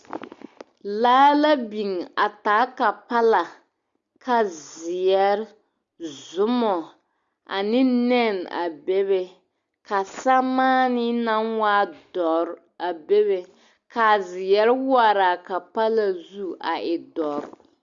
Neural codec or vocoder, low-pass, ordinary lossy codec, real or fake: none; 7.2 kHz; Opus, 64 kbps; real